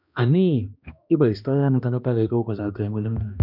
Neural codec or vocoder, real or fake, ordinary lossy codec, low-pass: codec, 16 kHz, 1 kbps, X-Codec, HuBERT features, trained on balanced general audio; fake; none; 5.4 kHz